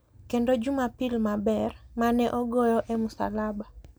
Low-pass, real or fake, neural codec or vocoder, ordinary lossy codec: none; real; none; none